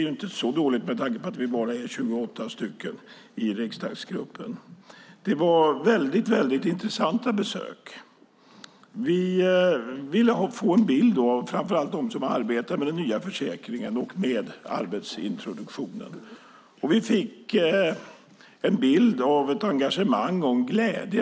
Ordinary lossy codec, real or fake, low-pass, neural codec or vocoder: none; real; none; none